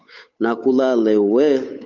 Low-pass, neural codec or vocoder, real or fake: 7.2 kHz; codec, 16 kHz, 8 kbps, FunCodec, trained on Chinese and English, 25 frames a second; fake